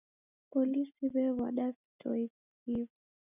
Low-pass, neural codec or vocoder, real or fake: 3.6 kHz; none; real